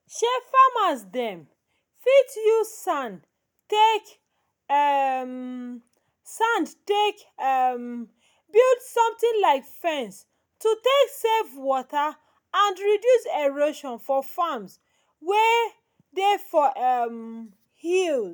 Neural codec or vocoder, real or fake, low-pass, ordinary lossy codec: none; real; none; none